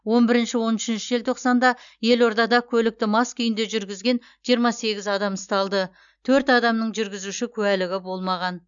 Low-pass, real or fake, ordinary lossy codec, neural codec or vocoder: 7.2 kHz; real; none; none